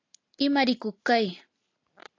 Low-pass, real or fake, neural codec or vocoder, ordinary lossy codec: 7.2 kHz; real; none; AAC, 48 kbps